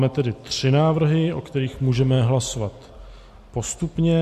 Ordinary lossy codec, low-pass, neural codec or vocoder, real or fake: MP3, 64 kbps; 14.4 kHz; none; real